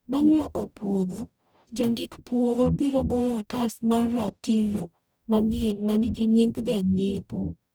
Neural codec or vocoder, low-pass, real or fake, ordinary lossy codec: codec, 44.1 kHz, 0.9 kbps, DAC; none; fake; none